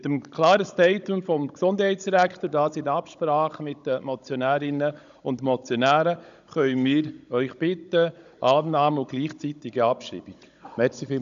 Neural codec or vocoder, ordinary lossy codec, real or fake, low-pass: codec, 16 kHz, 16 kbps, FunCodec, trained on Chinese and English, 50 frames a second; MP3, 96 kbps; fake; 7.2 kHz